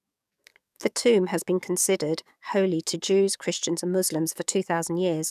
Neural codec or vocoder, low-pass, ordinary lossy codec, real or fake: codec, 44.1 kHz, 7.8 kbps, DAC; 14.4 kHz; none; fake